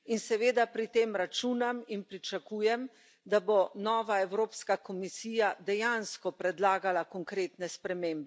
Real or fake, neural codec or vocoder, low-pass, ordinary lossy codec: real; none; none; none